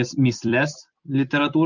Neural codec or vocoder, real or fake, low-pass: vocoder, 44.1 kHz, 128 mel bands every 256 samples, BigVGAN v2; fake; 7.2 kHz